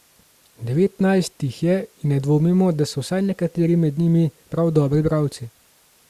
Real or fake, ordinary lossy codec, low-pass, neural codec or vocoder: real; Opus, 64 kbps; 14.4 kHz; none